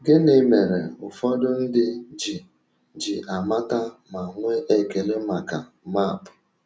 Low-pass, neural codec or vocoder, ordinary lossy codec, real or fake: none; none; none; real